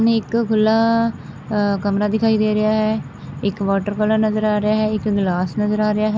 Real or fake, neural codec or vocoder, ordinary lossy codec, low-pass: real; none; none; none